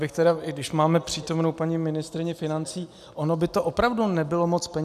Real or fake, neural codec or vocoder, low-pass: real; none; 14.4 kHz